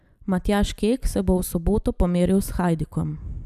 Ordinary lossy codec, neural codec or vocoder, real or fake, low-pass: none; none; real; 14.4 kHz